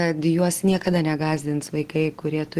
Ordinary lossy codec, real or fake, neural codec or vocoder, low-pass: Opus, 16 kbps; real; none; 14.4 kHz